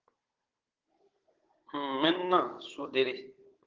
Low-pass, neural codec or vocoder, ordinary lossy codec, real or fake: 7.2 kHz; codec, 16 kHz, 16 kbps, FunCodec, trained on Chinese and English, 50 frames a second; Opus, 16 kbps; fake